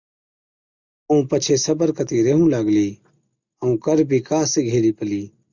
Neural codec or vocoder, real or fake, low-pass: none; real; 7.2 kHz